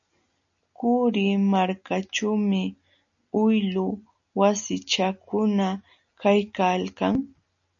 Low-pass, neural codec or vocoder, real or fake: 7.2 kHz; none; real